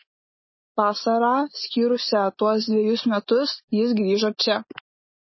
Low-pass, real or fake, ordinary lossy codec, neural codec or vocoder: 7.2 kHz; real; MP3, 24 kbps; none